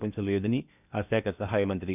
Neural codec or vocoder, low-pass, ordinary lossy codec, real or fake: codec, 16 kHz in and 24 kHz out, 0.8 kbps, FocalCodec, streaming, 65536 codes; 3.6 kHz; none; fake